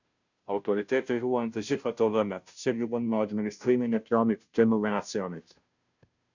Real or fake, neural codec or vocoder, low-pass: fake; codec, 16 kHz, 0.5 kbps, FunCodec, trained on Chinese and English, 25 frames a second; 7.2 kHz